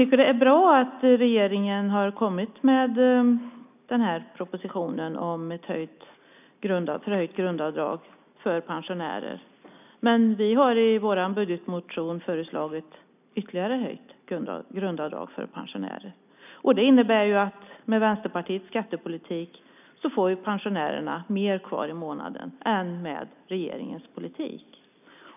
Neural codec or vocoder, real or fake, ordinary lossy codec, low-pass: none; real; none; 3.6 kHz